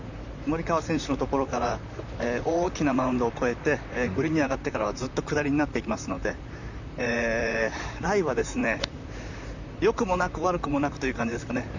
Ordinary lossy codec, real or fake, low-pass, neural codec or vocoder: none; fake; 7.2 kHz; vocoder, 44.1 kHz, 128 mel bands, Pupu-Vocoder